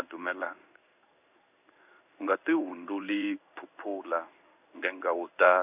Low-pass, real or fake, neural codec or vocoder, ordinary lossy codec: 3.6 kHz; fake; codec, 16 kHz in and 24 kHz out, 1 kbps, XY-Tokenizer; none